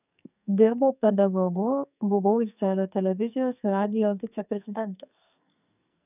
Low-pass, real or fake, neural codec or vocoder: 3.6 kHz; fake; codec, 44.1 kHz, 2.6 kbps, SNAC